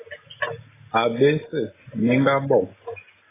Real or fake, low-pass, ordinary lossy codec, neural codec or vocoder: real; 3.6 kHz; AAC, 16 kbps; none